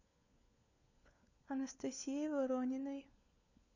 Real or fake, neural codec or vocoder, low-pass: fake; codec, 16 kHz, 4 kbps, FunCodec, trained on LibriTTS, 50 frames a second; 7.2 kHz